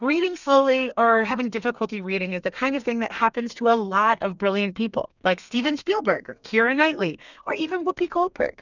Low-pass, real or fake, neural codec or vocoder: 7.2 kHz; fake; codec, 32 kHz, 1.9 kbps, SNAC